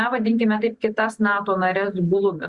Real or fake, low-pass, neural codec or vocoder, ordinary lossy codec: real; 10.8 kHz; none; Opus, 64 kbps